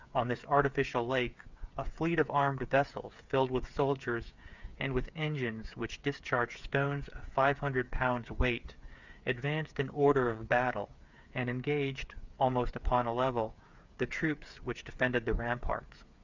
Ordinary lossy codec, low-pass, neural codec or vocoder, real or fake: Opus, 64 kbps; 7.2 kHz; codec, 16 kHz, 8 kbps, FreqCodec, smaller model; fake